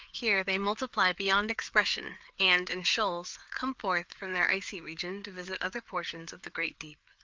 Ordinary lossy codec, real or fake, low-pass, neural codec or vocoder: Opus, 24 kbps; fake; 7.2 kHz; codec, 16 kHz, 4 kbps, FreqCodec, larger model